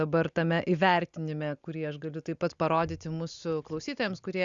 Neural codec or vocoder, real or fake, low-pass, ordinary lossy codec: none; real; 7.2 kHz; Opus, 64 kbps